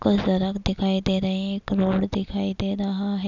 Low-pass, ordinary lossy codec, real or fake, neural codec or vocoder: 7.2 kHz; none; real; none